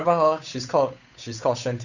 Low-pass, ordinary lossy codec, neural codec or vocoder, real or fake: 7.2 kHz; none; codec, 16 kHz, 8 kbps, FunCodec, trained on Chinese and English, 25 frames a second; fake